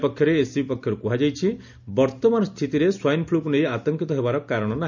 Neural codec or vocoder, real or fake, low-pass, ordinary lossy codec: none; real; 7.2 kHz; none